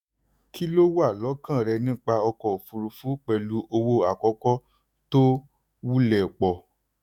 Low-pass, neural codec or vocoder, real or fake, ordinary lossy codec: 19.8 kHz; autoencoder, 48 kHz, 128 numbers a frame, DAC-VAE, trained on Japanese speech; fake; none